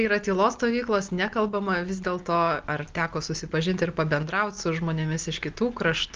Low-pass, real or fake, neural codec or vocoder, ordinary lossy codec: 7.2 kHz; real; none; Opus, 32 kbps